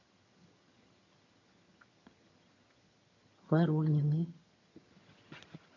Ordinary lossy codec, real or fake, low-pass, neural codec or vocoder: MP3, 32 kbps; fake; 7.2 kHz; vocoder, 22.05 kHz, 80 mel bands, HiFi-GAN